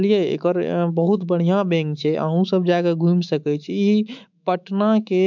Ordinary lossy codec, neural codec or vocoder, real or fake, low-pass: MP3, 64 kbps; autoencoder, 48 kHz, 128 numbers a frame, DAC-VAE, trained on Japanese speech; fake; 7.2 kHz